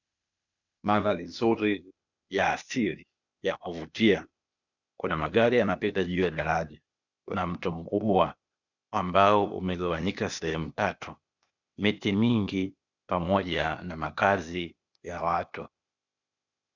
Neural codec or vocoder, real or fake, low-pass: codec, 16 kHz, 0.8 kbps, ZipCodec; fake; 7.2 kHz